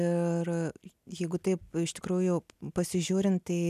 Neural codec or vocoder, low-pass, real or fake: none; 14.4 kHz; real